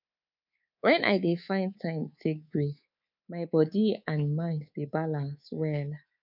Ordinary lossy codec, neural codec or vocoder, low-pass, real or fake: none; codec, 24 kHz, 3.1 kbps, DualCodec; 5.4 kHz; fake